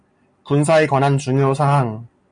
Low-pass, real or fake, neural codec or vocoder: 9.9 kHz; real; none